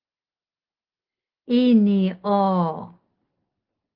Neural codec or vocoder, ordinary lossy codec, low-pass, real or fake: none; Opus, 16 kbps; 5.4 kHz; real